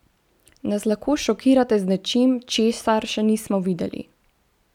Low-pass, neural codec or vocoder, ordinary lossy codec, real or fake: 19.8 kHz; none; none; real